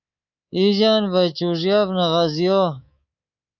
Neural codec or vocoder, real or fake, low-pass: codec, 24 kHz, 3.1 kbps, DualCodec; fake; 7.2 kHz